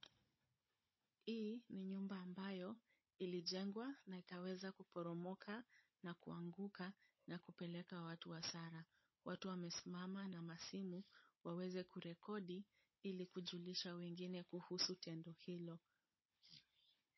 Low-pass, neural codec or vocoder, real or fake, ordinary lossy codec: 7.2 kHz; codec, 16 kHz, 16 kbps, FunCodec, trained on Chinese and English, 50 frames a second; fake; MP3, 24 kbps